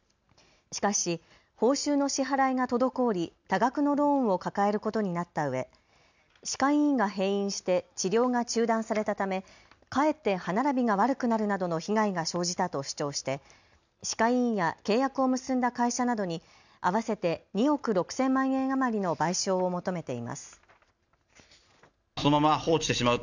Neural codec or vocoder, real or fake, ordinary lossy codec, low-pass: none; real; none; 7.2 kHz